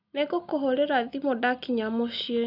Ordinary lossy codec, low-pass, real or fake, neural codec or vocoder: none; 5.4 kHz; real; none